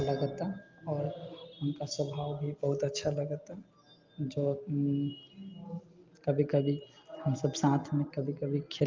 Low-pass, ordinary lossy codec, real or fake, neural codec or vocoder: 7.2 kHz; Opus, 24 kbps; real; none